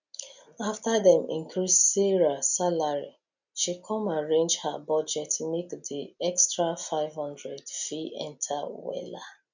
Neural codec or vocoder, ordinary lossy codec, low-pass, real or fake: none; none; 7.2 kHz; real